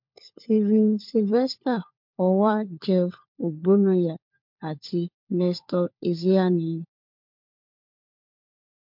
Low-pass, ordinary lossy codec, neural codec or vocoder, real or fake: 5.4 kHz; MP3, 48 kbps; codec, 16 kHz, 4 kbps, FunCodec, trained on LibriTTS, 50 frames a second; fake